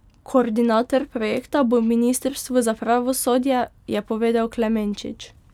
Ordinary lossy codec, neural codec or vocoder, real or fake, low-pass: none; none; real; 19.8 kHz